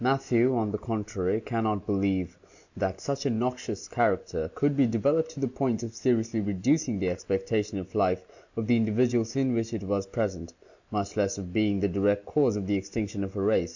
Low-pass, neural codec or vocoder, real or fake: 7.2 kHz; none; real